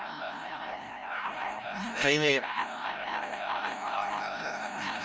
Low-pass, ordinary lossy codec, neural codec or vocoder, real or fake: none; none; codec, 16 kHz, 0.5 kbps, FreqCodec, larger model; fake